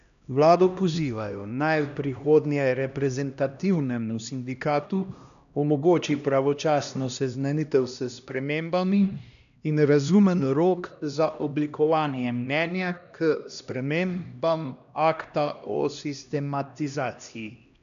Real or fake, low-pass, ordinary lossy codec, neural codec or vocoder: fake; 7.2 kHz; none; codec, 16 kHz, 1 kbps, X-Codec, HuBERT features, trained on LibriSpeech